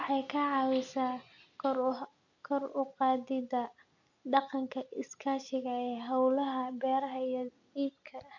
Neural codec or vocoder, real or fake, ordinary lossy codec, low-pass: none; real; none; 7.2 kHz